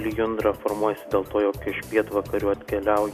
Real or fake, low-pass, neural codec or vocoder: real; 14.4 kHz; none